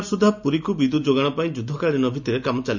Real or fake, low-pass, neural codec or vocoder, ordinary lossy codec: real; 7.2 kHz; none; none